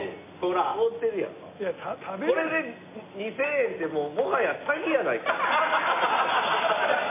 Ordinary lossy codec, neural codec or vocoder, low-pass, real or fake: MP3, 24 kbps; none; 3.6 kHz; real